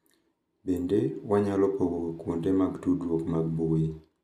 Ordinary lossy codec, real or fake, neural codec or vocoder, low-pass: none; real; none; 14.4 kHz